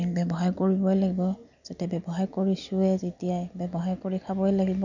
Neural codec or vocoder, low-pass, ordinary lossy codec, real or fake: none; 7.2 kHz; none; real